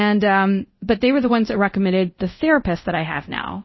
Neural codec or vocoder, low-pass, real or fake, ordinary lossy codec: codec, 24 kHz, 0.5 kbps, DualCodec; 7.2 kHz; fake; MP3, 24 kbps